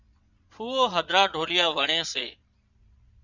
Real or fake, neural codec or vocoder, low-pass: fake; vocoder, 22.05 kHz, 80 mel bands, Vocos; 7.2 kHz